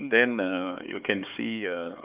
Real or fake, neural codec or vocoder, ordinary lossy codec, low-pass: fake; codec, 16 kHz, 4 kbps, X-Codec, HuBERT features, trained on LibriSpeech; Opus, 24 kbps; 3.6 kHz